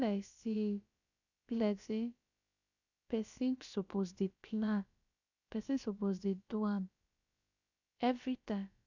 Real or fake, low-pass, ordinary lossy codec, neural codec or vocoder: fake; 7.2 kHz; none; codec, 16 kHz, about 1 kbps, DyCAST, with the encoder's durations